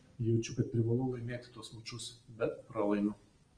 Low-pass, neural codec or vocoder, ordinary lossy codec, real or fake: 9.9 kHz; none; Opus, 24 kbps; real